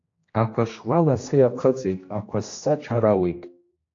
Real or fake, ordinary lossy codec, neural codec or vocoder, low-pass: fake; AAC, 48 kbps; codec, 16 kHz, 1 kbps, X-Codec, HuBERT features, trained on general audio; 7.2 kHz